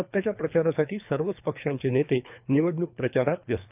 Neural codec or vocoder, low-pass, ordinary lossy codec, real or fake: codec, 24 kHz, 3 kbps, HILCodec; 3.6 kHz; none; fake